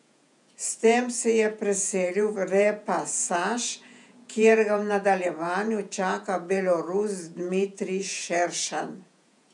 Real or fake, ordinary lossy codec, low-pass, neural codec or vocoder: fake; none; 10.8 kHz; vocoder, 48 kHz, 128 mel bands, Vocos